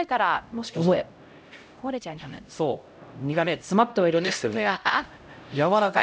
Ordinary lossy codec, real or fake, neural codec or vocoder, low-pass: none; fake; codec, 16 kHz, 0.5 kbps, X-Codec, HuBERT features, trained on LibriSpeech; none